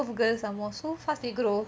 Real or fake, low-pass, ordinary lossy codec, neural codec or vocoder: real; none; none; none